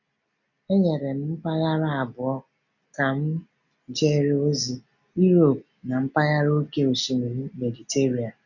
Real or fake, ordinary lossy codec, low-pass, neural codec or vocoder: real; none; 7.2 kHz; none